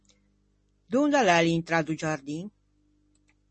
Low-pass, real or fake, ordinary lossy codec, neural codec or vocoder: 9.9 kHz; real; MP3, 32 kbps; none